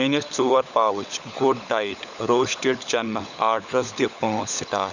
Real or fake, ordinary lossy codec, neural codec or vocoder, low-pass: fake; none; codec, 16 kHz, 16 kbps, FunCodec, trained on LibriTTS, 50 frames a second; 7.2 kHz